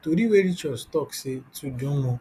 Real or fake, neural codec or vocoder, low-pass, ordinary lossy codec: real; none; 14.4 kHz; none